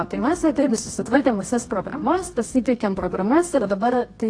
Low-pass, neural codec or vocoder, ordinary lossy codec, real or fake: 9.9 kHz; codec, 24 kHz, 0.9 kbps, WavTokenizer, medium music audio release; AAC, 48 kbps; fake